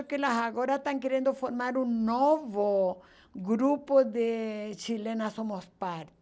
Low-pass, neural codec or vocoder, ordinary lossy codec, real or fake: none; none; none; real